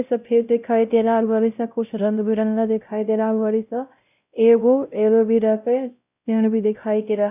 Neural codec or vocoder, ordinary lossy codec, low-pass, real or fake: codec, 16 kHz, 0.5 kbps, X-Codec, WavLM features, trained on Multilingual LibriSpeech; none; 3.6 kHz; fake